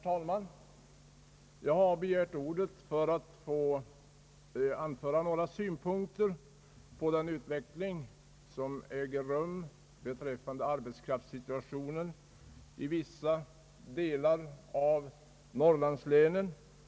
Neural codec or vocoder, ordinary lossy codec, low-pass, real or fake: none; none; none; real